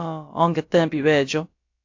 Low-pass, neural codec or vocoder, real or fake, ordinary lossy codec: 7.2 kHz; codec, 16 kHz, about 1 kbps, DyCAST, with the encoder's durations; fake; AAC, 48 kbps